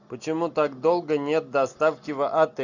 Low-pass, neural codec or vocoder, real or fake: 7.2 kHz; none; real